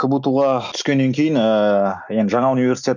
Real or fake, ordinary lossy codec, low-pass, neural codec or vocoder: real; none; 7.2 kHz; none